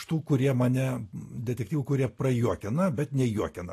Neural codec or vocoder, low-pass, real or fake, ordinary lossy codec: none; 14.4 kHz; real; AAC, 48 kbps